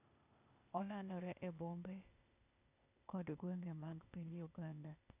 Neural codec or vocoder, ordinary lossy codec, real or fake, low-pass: codec, 16 kHz, 0.8 kbps, ZipCodec; none; fake; 3.6 kHz